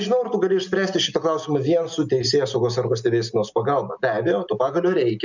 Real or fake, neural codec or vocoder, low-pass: real; none; 7.2 kHz